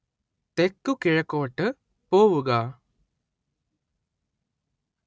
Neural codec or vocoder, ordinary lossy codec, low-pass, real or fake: none; none; none; real